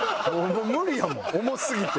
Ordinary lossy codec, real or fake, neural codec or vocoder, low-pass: none; real; none; none